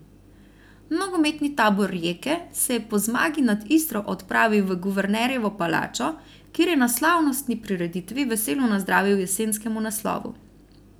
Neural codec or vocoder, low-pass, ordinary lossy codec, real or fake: none; none; none; real